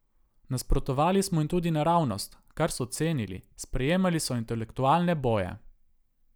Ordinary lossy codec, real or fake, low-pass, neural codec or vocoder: none; real; none; none